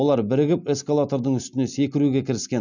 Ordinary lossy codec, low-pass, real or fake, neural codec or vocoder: none; 7.2 kHz; real; none